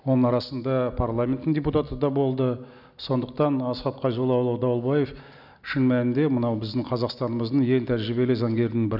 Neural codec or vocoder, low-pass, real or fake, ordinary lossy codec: none; 5.4 kHz; real; none